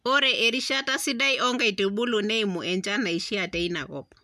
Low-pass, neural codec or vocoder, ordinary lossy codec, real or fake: 14.4 kHz; none; none; real